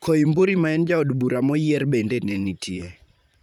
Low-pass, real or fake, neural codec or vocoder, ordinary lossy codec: 19.8 kHz; fake; vocoder, 44.1 kHz, 128 mel bands, Pupu-Vocoder; none